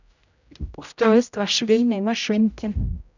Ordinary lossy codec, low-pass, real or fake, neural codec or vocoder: none; 7.2 kHz; fake; codec, 16 kHz, 0.5 kbps, X-Codec, HuBERT features, trained on general audio